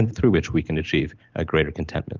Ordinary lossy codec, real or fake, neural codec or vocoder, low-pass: Opus, 32 kbps; real; none; 7.2 kHz